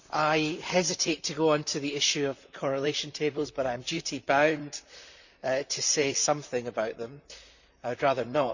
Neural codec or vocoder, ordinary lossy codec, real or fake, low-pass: vocoder, 44.1 kHz, 128 mel bands, Pupu-Vocoder; none; fake; 7.2 kHz